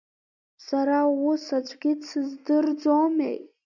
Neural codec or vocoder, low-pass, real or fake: none; 7.2 kHz; real